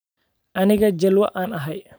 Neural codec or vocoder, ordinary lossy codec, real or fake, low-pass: vocoder, 44.1 kHz, 128 mel bands every 512 samples, BigVGAN v2; none; fake; none